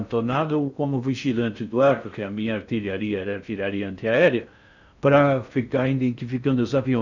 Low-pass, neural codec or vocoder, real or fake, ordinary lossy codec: 7.2 kHz; codec, 16 kHz in and 24 kHz out, 0.6 kbps, FocalCodec, streaming, 2048 codes; fake; none